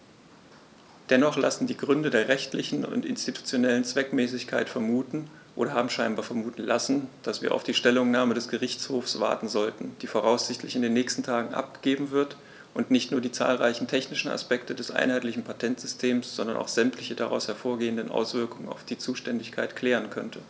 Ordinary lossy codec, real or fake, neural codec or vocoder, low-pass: none; real; none; none